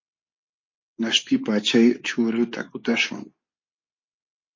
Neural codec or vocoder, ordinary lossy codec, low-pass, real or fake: codec, 24 kHz, 0.9 kbps, WavTokenizer, medium speech release version 2; MP3, 32 kbps; 7.2 kHz; fake